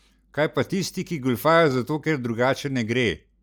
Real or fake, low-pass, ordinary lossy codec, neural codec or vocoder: real; none; none; none